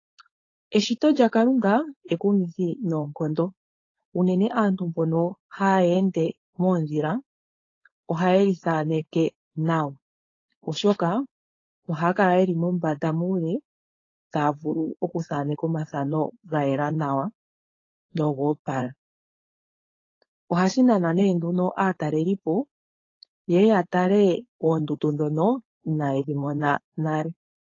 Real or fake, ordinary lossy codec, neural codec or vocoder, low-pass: fake; AAC, 32 kbps; codec, 16 kHz, 4.8 kbps, FACodec; 7.2 kHz